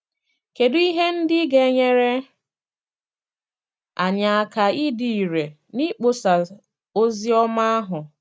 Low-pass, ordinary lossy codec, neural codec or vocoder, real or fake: none; none; none; real